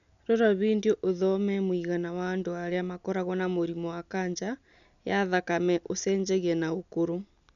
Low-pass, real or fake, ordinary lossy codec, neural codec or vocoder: 7.2 kHz; real; none; none